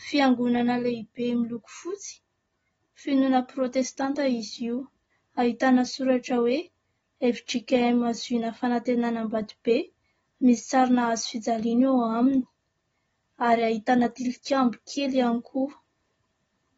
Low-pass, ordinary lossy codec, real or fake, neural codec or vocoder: 19.8 kHz; AAC, 24 kbps; real; none